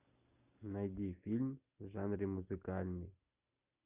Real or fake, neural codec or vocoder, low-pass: real; none; 3.6 kHz